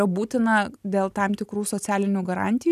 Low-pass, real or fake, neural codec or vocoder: 14.4 kHz; real; none